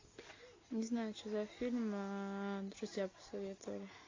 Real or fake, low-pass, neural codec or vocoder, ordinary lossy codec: real; 7.2 kHz; none; AAC, 32 kbps